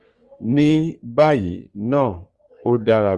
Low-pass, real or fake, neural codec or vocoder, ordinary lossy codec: 10.8 kHz; fake; codec, 44.1 kHz, 3.4 kbps, Pupu-Codec; Opus, 32 kbps